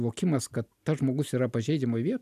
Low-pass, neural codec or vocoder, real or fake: 14.4 kHz; none; real